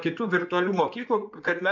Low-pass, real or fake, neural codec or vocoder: 7.2 kHz; fake; codec, 16 kHz in and 24 kHz out, 2.2 kbps, FireRedTTS-2 codec